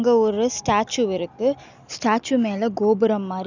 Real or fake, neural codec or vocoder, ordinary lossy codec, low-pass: real; none; none; 7.2 kHz